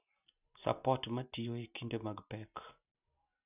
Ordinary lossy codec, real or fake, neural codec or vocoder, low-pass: none; real; none; 3.6 kHz